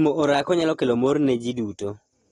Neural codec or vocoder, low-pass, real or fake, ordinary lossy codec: none; 10.8 kHz; real; AAC, 32 kbps